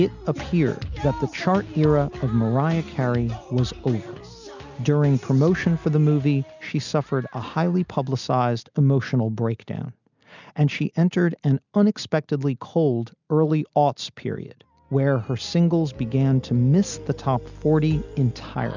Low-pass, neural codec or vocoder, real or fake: 7.2 kHz; none; real